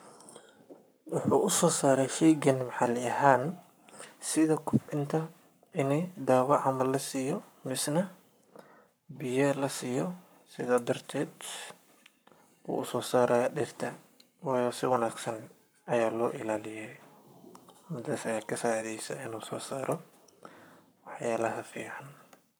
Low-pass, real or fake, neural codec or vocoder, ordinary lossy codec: none; fake; codec, 44.1 kHz, 7.8 kbps, Pupu-Codec; none